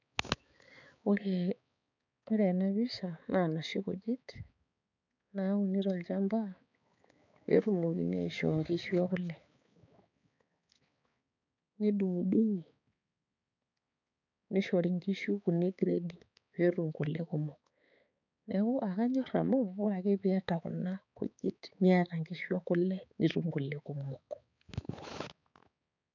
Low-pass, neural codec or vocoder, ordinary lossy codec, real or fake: 7.2 kHz; codec, 16 kHz, 4 kbps, X-Codec, HuBERT features, trained on balanced general audio; none; fake